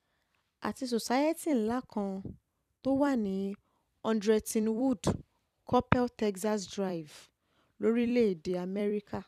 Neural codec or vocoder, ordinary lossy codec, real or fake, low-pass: vocoder, 44.1 kHz, 128 mel bands every 256 samples, BigVGAN v2; none; fake; 14.4 kHz